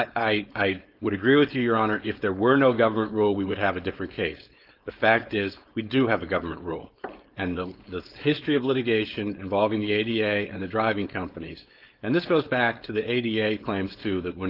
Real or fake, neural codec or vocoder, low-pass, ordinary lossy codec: fake; codec, 16 kHz, 4.8 kbps, FACodec; 5.4 kHz; Opus, 32 kbps